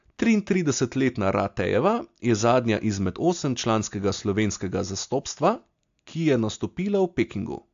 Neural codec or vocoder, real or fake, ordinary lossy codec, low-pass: none; real; AAC, 64 kbps; 7.2 kHz